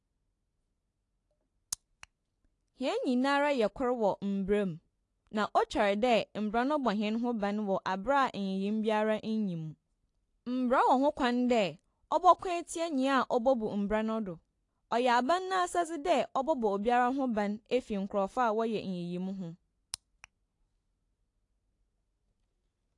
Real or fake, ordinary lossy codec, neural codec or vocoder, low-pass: real; AAC, 48 kbps; none; 10.8 kHz